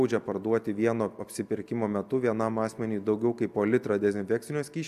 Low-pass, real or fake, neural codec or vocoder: 14.4 kHz; real; none